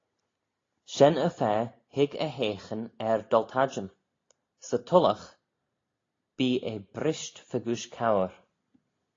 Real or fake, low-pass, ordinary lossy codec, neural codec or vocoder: real; 7.2 kHz; AAC, 32 kbps; none